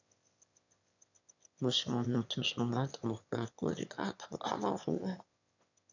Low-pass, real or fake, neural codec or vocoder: 7.2 kHz; fake; autoencoder, 22.05 kHz, a latent of 192 numbers a frame, VITS, trained on one speaker